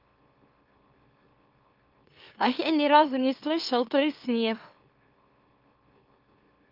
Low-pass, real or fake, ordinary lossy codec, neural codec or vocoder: 5.4 kHz; fake; Opus, 32 kbps; autoencoder, 44.1 kHz, a latent of 192 numbers a frame, MeloTTS